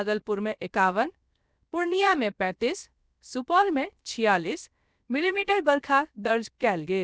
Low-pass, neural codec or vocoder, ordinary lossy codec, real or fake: none; codec, 16 kHz, 0.7 kbps, FocalCodec; none; fake